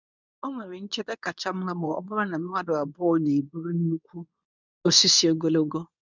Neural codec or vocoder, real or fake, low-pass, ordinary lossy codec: codec, 24 kHz, 0.9 kbps, WavTokenizer, medium speech release version 2; fake; 7.2 kHz; none